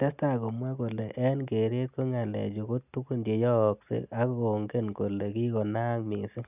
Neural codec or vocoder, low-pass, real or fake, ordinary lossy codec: none; 3.6 kHz; real; none